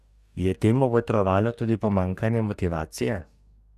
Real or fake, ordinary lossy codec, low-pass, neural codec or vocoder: fake; none; 14.4 kHz; codec, 44.1 kHz, 2.6 kbps, DAC